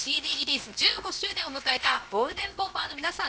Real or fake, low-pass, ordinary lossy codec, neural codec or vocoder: fake; none; none; codec, 16 kHz, about 1 kbps, DyCAST, with the encoder's durations